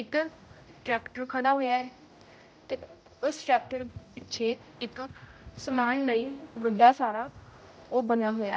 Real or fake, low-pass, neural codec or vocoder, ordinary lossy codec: fake; none; codec, 16 kHz, 0.5 kbps, X-Codec, HuBERT features, trained on general audio; none